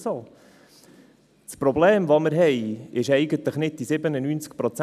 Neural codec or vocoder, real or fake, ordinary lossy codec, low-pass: none; real; none; 14.4 kHz